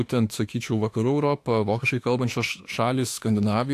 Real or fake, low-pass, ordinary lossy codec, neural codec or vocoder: fake; 14.4 kHz; AAC, 64 kbps; autoencoder, 48 kHz, 32 numbers a frame, DAC-VAE, trained on Japanese speech